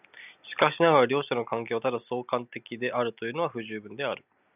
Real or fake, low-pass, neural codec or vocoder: real; 3.6 kHz; none